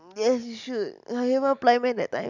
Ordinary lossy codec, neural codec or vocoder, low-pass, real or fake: none; none; 7.2 kHz; real